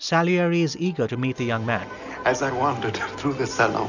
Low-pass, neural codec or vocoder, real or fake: 7.2 kHz; none; real